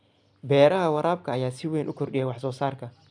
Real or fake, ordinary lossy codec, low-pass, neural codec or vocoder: real; none; 9.9 kHz; none